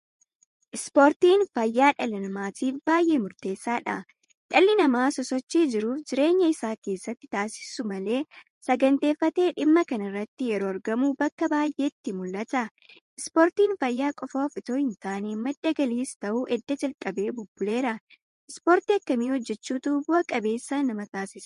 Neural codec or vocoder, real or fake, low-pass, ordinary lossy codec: vocoder, 24 kHz, 100 mel bands, Vocos; fake; 10.8 kHz; MP3, 48 kbps